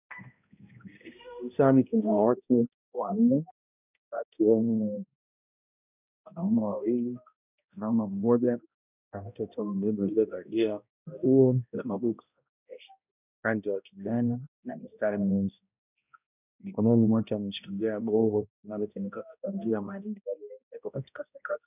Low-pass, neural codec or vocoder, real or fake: 3.6 kHz; codec, 16 kHz, 0.5 kbps, X-Codec, HuBERT features, trained on balanced general audio; fake